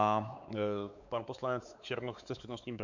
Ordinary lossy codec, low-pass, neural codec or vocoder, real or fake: Opus, 64 kbps; 7.2 kHz; codec, 16 kHz, 4 kbps, X-Codec, WavLM features, trained on Multilingual LibriSpeech; fake